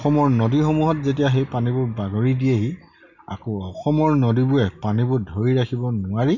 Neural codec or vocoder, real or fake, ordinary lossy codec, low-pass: none; real; none; 7.2 kHz